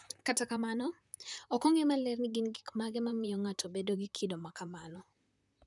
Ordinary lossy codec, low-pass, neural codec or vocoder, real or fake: none; 10.8 kHz; vocoder, 44.1 kHz, 128 mel bands, Pupu-Vocoder; fake